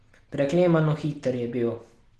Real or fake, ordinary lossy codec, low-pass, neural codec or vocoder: real; Opus, 16 kbps; 10.8 kHz; none